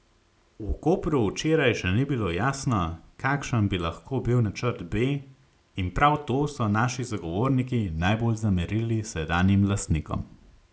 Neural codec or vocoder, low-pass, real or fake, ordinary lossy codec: none; none; real; none